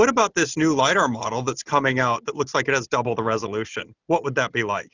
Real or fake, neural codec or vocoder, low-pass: real; none; 7.2 kHz